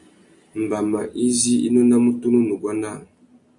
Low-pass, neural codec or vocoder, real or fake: 10.8 kHz; none; real